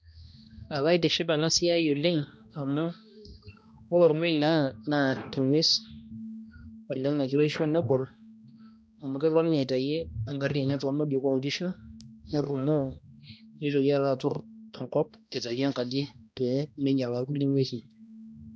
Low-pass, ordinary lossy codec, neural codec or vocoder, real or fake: none; none; codec, 16 kHz, 1 kbps, X-Codec, HuBERT features, trained on balanced general audio; fake